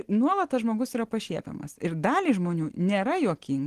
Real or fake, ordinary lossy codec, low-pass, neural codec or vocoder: real; Opus, 16 kbps; 14.4 kHz; none